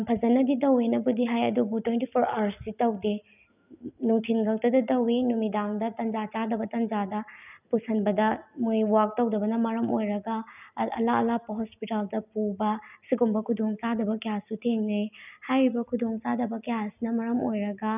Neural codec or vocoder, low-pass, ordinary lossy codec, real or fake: none; 3.6 kHz; none; real